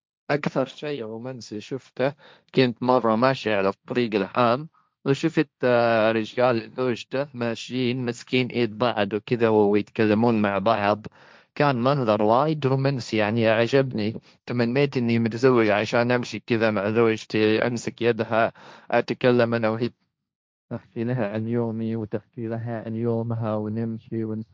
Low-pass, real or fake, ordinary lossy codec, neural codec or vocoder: none; fake; none; codec, 16 kHz, 1.1 kbps, Voila-Tokenizer